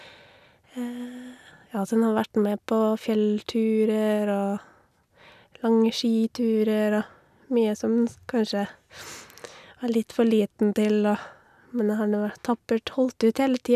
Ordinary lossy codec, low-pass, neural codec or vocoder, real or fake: none; 14.4 kHz; none; real